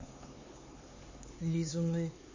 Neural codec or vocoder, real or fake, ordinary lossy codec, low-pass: codec, 16 kHz, 4 kbps, X-Codec, HuBERT features, trained on LibriSpeech; fake; MP3, 32 kbps; 7.2 kHz